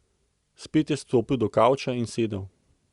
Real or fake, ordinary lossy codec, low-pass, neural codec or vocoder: real; Opus, 64 kbps; 10.8 kHz; none